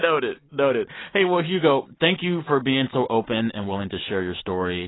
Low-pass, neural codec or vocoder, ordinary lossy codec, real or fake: 7.2 kHz; none; AAC, 16 kbps; real